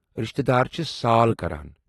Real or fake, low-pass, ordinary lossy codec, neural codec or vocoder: real; 19.8 kHz; AAC, 32 kbps; none